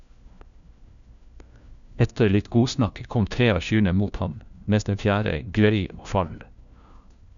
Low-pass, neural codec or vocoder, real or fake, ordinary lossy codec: 7.2 kHz; codec, 16 kHz, 1 kbps, FunCodec, trained on LibriTTS, 50 frames a second; fake; none